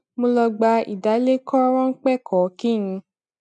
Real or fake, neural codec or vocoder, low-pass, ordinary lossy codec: real; none; 10.8 kHz; none